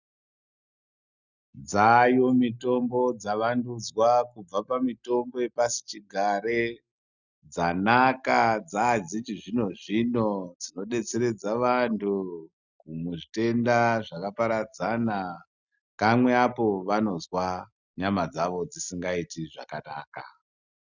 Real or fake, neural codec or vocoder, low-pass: real; none; 7.2 kHz